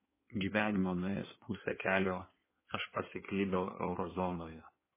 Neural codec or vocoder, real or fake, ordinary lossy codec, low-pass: codec, 16 kHz in and 24 kHz out, 1.1 kbps, FireRedTTS-2 codec; fake; MP3, 16 kbps; 3.6 kHz